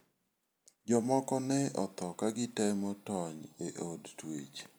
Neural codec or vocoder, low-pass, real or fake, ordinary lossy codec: none; none; real; none